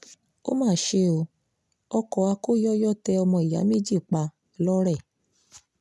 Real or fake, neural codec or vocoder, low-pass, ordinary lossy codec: real; none; none; none